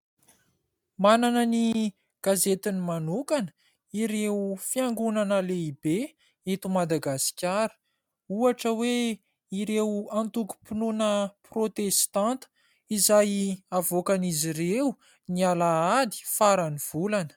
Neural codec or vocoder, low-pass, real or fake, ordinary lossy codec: none; 19.8 kHz; real; MP3, 96 kbps